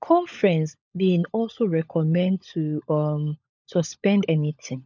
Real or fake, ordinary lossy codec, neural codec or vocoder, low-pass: fake; none; codec, 16 kHz, 16 kbps, FunCodec, trained on LibriTTS, 50 frames a second; 7.2 kHz